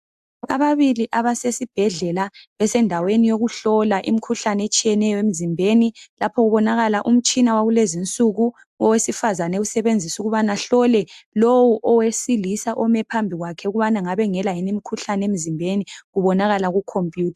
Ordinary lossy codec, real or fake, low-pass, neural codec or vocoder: AAC, 96 kbps; real; 14.4 kHz; none